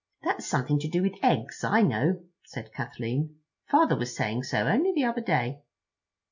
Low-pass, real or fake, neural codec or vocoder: 7.2 kHz; real; none